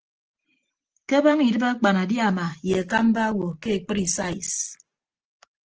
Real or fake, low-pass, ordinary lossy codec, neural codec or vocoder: real; 7.2 kHz; Opus, 16 kbps; none